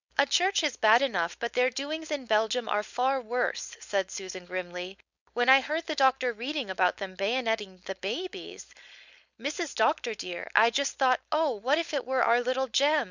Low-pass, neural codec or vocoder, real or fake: 7.2 kHz; codec, 16 kHz, 4.8 kbps, FACodec; fake